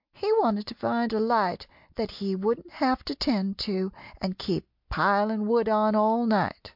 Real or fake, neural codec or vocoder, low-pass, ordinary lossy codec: real; none; 5.4 kHz; MP3, 48 kbps